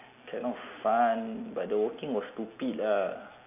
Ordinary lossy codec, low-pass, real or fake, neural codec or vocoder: none; 3.6 kHz; real; none